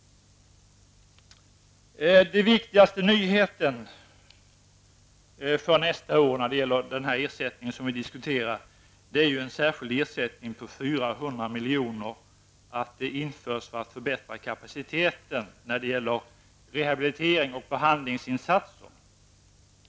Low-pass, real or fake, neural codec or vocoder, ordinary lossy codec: none; real; none; none